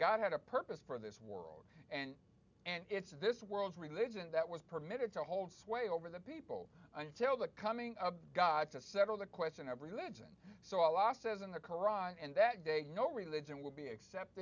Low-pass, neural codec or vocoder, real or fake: 7.2 kHz; none; real